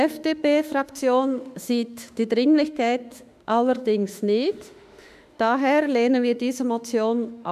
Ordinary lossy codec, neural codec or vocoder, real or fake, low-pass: none; autoencoder, 48 kHz, 32 numbers a frame, DAC-VAE, trained on Japanese speech; fake; 14.4 kHz